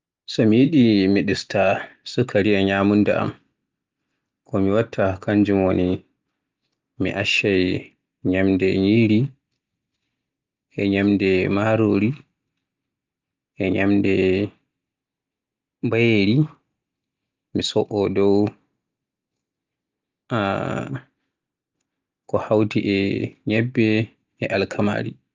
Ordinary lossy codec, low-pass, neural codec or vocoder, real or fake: Opus, 32 kbps; 7.2 kHz; none; real